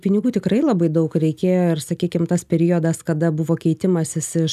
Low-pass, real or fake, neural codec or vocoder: 14.4 kHz; real; none